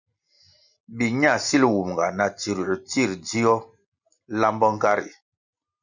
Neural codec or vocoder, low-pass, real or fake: none; 7.2 kHz; real